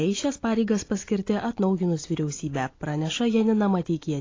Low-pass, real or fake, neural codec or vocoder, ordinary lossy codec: 7.2 kHz; real; none; AAC, 32 kbps